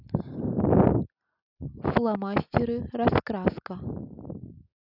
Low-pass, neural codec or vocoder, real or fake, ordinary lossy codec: 5.4 kHz; none; real; none